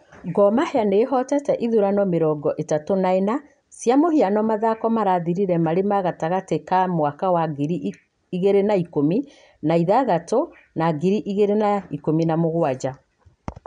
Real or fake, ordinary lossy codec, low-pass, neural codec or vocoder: real; none; 9.9 kHz; none